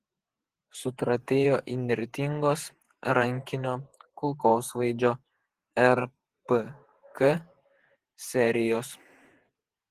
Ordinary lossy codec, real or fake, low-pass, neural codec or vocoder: Opus, 16 kbps; fake; 14.4 kHz; vocoder, 48 kHz, 128 mel bands, Vocos